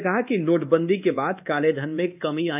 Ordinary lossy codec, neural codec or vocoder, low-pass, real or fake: none; codec, 24 kHz, 1.2 kbps, DualCodec; 3.6 kHz; fake